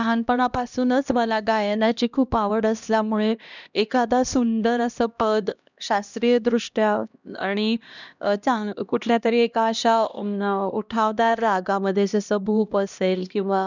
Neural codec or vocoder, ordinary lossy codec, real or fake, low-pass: codec, 16 kHz, 1 kbps, X-Codec, HuBERT features, trained on LibriSpeech; none; fake; 7.2 kHz